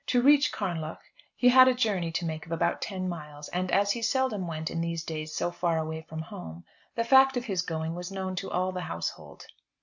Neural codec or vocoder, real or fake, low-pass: none; real; 7.2 kHz